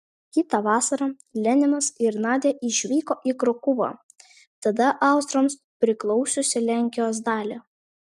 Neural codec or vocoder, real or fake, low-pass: none; real; 14.4 kHz